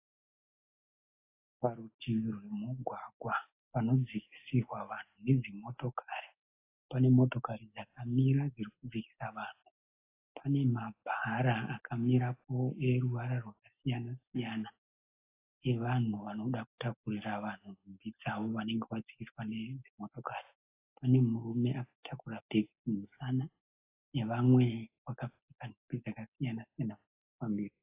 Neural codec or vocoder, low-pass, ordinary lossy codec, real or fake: none; 3.6 kHz; AAC, 24 kbps; real